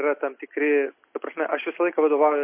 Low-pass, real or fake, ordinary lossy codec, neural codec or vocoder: 3.6 kHz; real; MP3, 24 kbps; none